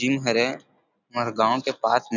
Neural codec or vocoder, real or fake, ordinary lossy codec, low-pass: none; real; none; 7.2 kHz